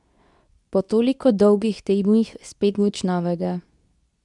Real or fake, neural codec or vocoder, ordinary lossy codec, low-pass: fake; codec, 24 kHz, 0.9 kbps, WavTokenizer, medium speech release version 2; none; 10.8 kHz